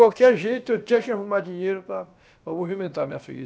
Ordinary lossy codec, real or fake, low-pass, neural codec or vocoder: none; fake; none; codec, 16 kHz, about 1 kbps, DyCAST, with the encoder's durations